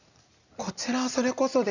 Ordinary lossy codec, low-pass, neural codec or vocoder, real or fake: none; 7.2 kHz; none; real